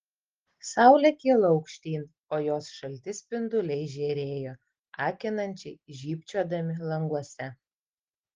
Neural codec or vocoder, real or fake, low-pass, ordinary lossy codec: none; real; 7.2 kHz; Opus, 16 kbps